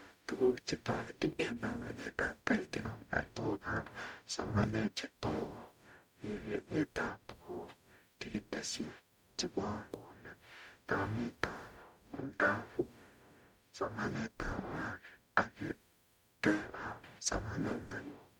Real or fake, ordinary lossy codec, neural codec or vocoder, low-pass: fake; none; codec, 44.1 kHz, 0.9 kbps, DAC; 19.8 kHz